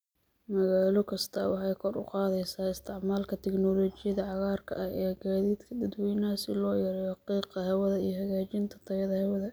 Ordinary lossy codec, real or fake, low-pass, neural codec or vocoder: none; real; none; none